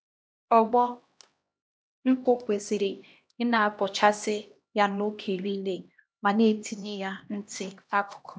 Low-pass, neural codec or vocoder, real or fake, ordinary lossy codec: none; codec, 16 kHz, 1 kbps, X-Codec, HuBERT features, trained on LibriSpeech; fake; none